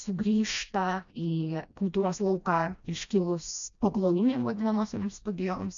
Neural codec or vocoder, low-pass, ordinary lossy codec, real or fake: codec, 16 kHz, 1 kbps, FreqCodec, smaller model; 7.2 kHz; AAC, 64 kbps; fake